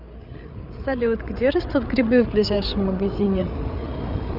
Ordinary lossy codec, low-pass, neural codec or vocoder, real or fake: none; 5.4 kHz; codec, 16 kHz, 8 kbps, FreqCodec, larger model; fake